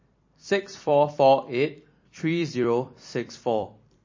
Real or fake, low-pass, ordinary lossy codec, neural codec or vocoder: fake; 7.2 kHz; MP3, 32 kbps; vocoder, 44.1 kHz, 128 mel bands every 256 samples, BigVGAN v2